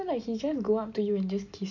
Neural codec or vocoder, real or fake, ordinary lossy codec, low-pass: none; real; MP3, 48 kbps; 7.2 kHz